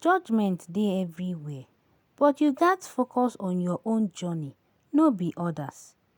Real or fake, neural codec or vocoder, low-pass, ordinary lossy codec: real; none; 19.8 kHz; none